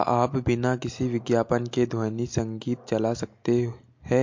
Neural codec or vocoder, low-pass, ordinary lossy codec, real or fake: none; 7.2 kHz; MP3, 48 kbps; real